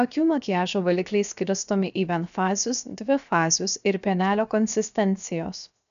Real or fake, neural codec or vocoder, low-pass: fake; codec, 16 kHz, 0.7 kbps, FocalCodec; 7.2 kHz